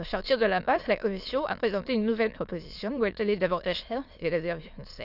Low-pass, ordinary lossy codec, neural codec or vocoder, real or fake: 5.4 kHz; Opus, 64 kbps; autoencoder, 22.05 kHz, a latent of 192 numbers a frame, VITS, trained on many speakers; fake